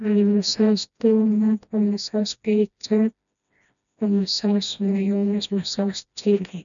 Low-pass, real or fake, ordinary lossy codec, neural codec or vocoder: 7.2 kHz; fake; none; codec, 16 kHz, 1 kbps, FreqCodec, smaller model